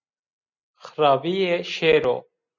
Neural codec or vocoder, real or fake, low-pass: none; real; 7.2 kHz